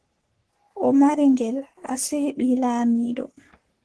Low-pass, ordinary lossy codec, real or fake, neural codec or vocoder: 10.8 kHz; Opus, 16 kbps; fake; codec, 44.1 kHz, 3.4 kbps, Pupu-Codec